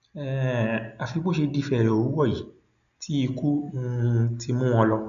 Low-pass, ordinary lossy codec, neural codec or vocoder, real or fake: 7.2 kHz; none; none; real